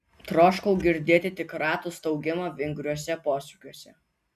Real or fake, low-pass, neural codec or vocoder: real; 14.4 kHz; none